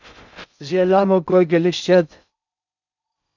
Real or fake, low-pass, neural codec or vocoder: fake; 7.2 kHz; codec, 16 kHz in and 24 kHz out, 0.6 kbps, FocalCodec, streaming, 2048 codes